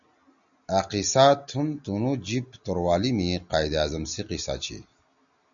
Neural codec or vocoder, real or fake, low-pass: none; real; 7.2 kHz